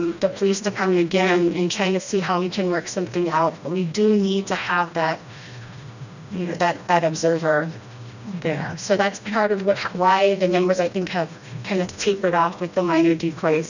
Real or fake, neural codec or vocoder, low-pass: fake; codec, 16 kHz, 1 kbps, FreqCodec, smaller model; 7.2 kHz